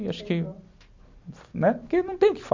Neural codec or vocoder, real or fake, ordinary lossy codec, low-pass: none; real; MP3, 48 kbps; 7.2 kHz